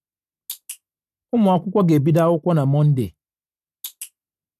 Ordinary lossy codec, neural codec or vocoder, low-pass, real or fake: none; none; 14.4 kHz; real